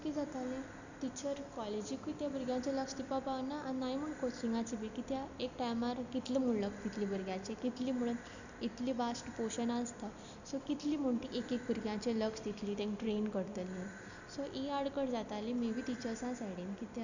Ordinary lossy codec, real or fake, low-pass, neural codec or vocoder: none; real; 7.2 kHz; none